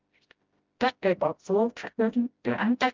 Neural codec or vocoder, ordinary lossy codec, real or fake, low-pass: codec, 16 kHz, 0.5 kbps, FreqCodec, smaller model; Opus, 24 kbps; fake; 7.2 kHz